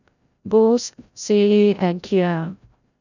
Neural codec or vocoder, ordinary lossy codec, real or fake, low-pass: codec, 16 kHz, 0.5 kbps, FreqCodec, larger model; none; fake; 7.2 kHz